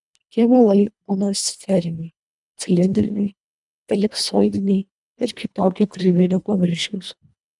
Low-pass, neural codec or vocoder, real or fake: 10.8 kHz; codec, 24 kHz, 1.5 kbps, HILCodec; fake